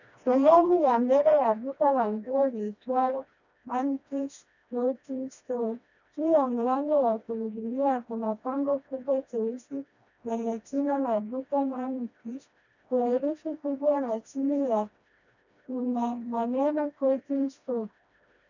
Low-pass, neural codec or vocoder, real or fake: 7.2 kHz; codec, 16 kHz, 1 kbps, FreqCodec, smaller model; fake